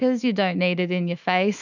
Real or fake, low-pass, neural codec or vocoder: fake; 7.2 kHz; autoencoder, 48 kHz, 128 numbers a frame, DAC-VAE, trained on Japanese speech